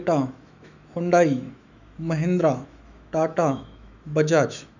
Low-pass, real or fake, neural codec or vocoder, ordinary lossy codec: 7.2 kHz; fake; autoencoder, 48 kHz, 128 numbers a frame, DAC-VAE, trained on Japanese speech; none